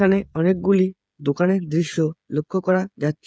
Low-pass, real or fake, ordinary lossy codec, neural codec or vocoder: none; fake; none; codec, 16 kHz, 8 kbps, FreqCodec, smaller model